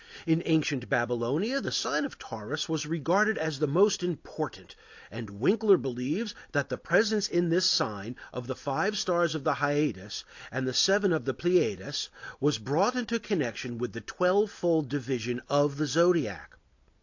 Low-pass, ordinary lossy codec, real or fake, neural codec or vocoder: 7.2 kHz; AAC, 48 kbps; real; none